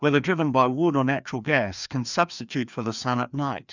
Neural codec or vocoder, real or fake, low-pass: codec, 16 kHz, 2 kbps, FreqCodec, larger model; fake; 7.2 kHz